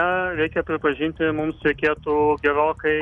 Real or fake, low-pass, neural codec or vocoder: real; 10.8 kHz; none